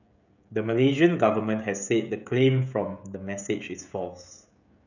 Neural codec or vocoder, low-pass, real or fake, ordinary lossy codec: codec, 16 kHz, 16 kbps, FreqCodec, smaller model; 7.2 kHz; fake; none